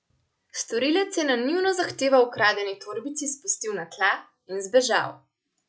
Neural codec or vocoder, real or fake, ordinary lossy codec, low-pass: none; real; none; none